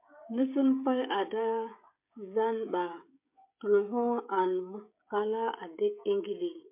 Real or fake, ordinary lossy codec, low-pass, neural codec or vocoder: fake; MP3, 32 kbps; 3.6 kHz; codec, 16 kHz, 16 kbps, FreqCodec, smaller model